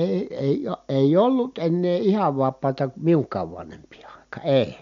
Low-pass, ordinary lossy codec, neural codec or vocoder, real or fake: 7.2 kHz; MP3, 64 kbps; none; real